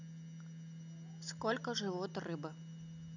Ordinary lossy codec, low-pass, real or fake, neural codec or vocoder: none; 7.2 kHz; real; none